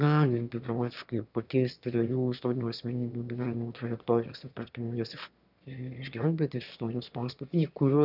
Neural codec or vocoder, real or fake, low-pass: autoencoder, 22.05 kHz, a latent of 192 numbers a frame, VITS, trained on one speaker; fake; 5.4 kHz